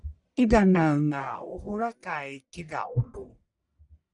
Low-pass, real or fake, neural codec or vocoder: 10.8 kHz; fake; codec, 44.1 kHz, 1.7 kbps, Pupu-Codec